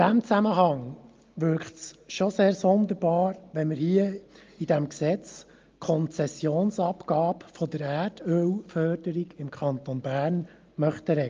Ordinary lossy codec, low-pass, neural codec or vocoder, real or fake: Opus, 32 kbps; 7.2 kHz; none; real